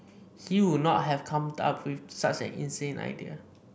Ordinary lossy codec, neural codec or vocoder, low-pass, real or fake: none; none; none; real